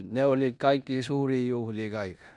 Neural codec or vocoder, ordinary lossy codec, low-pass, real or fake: codec, 16 kHz in and 24 kHz out, 0.9 kbps, LongCat-Audio-Codec, four codebook decoder; none; 10.8 kHz; fake